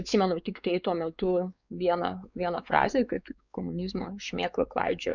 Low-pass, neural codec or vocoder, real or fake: 7.2 kHz; codec, 16 kHz, 4 kbps, X-Codec, WavLM features, trained on Multilingual LibriSpeech; fake